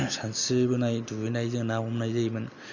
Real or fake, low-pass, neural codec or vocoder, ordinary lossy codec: real; 7.2 kHz; none; none